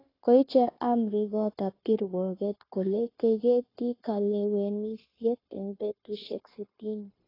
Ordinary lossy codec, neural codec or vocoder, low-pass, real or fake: AAC, 24 kbps; codec, 16 kHz, 0.9 kbps, LongCat-Audio-Codec; 5.4 kHz; fake